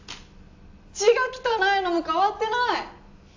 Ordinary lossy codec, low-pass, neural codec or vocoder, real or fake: AAC, 48 kbps; 7.2 kHz; none; real